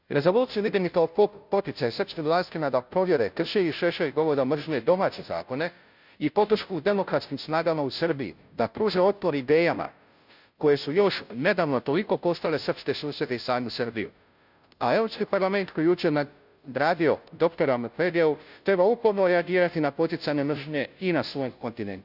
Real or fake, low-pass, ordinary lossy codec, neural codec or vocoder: fake; 5.4 kHz; none; codec, 16 kHz, 0.5 kbps, FunCodec, trained on Chinese and English, 25 frames a second